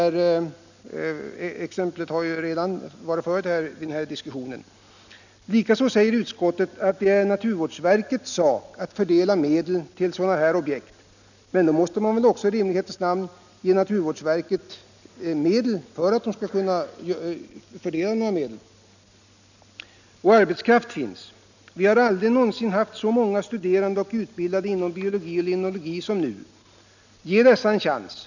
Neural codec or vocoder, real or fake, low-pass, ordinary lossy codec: none; real; 7.2 kHz; none